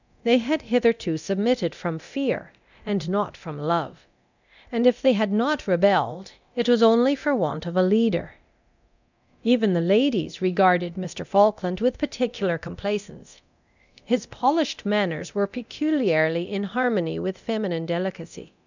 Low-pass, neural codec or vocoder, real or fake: 7.2 kHz; codec, 24 kHz, 0.9 kbps, DualCodec; fake